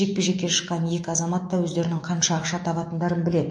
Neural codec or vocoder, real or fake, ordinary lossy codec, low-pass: autoencoder, 48 kHz, 128 numbers a frame, DAC-VAE, trained on Japanese speech; fake; MP3, 48 kbps; 9.9 kHz